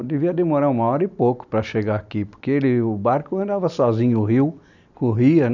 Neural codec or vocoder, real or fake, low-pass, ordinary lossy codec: none; real; 7.2 kHz; none